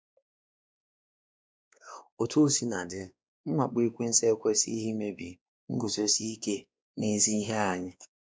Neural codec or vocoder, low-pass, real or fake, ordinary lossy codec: codec, 16 kHz, 2 kbps, X-Codec, WavLM features, trained on Multilingual LibriSpeech; none; fake; none